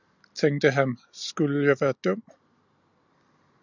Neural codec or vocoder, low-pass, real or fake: none; 7.2 kHz; real